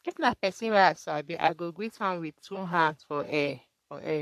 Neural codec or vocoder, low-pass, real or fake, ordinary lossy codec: codec, 44.1 kHz, 3.4 kbps, Pupu-Codec; 14.4 kHz; fake; MP3, 96 kbps